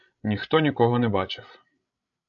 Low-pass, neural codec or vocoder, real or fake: 7.2 kHz; codec, 16 kHz, 16 kbps, FreqCodec, larger model; fake